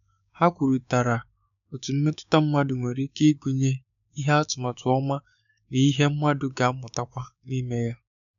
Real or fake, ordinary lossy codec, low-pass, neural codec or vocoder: fake; none; 7.2 kHz; codec, 16 kHz, 6 kbps, DAC